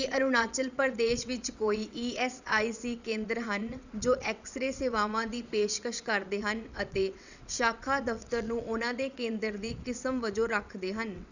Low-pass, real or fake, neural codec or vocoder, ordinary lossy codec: 7.2 kHz; fake; vocoder, 22.05 kHz, 80 mel bands, WaveNeXt; none